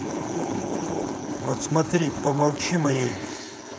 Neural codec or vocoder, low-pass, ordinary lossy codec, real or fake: codec, 16 kHz, 4.8 kbps, FACodec; none; none; fake